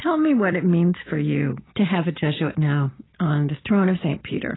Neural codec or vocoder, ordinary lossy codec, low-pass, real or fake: none; AAC, 16 kbps; 7.2 kHz; real